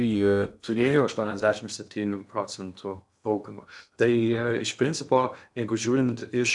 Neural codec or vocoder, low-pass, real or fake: codec, 16 kHz in and 24 kHz out, 0.8 kbps, FocalCodec, streaming, 65536 codes; 10.8 kHz; fake